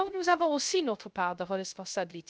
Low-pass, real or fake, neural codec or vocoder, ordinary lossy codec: none; fake; codec, 16 kHz, 0.3 kbps, FocalCodec; none